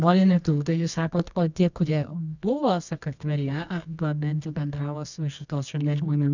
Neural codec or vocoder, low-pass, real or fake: codec, 24 kHz, 0.9 kbps, WavTokenizer, medium music audio release; 7.2 kHz; fake